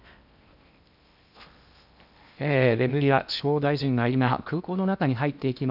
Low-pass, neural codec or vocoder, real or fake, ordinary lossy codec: 5.4 kHz; codec, 16 kHz in and 24 kHz out, 0.8 kbps, FocalCodec, streaming, 65536 codes; fake; none